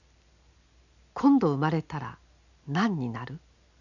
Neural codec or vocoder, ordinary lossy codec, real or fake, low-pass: none; none; real; 7.2 kHz